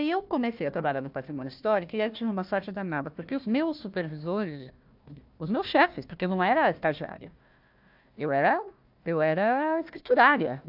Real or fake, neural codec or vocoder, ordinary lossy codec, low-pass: fake; codec, 16 kHz, 1 kbps, FunCodec, trained on Chinese and English, 50 frames a second; AAC, 48 kbps; 5.4 kHz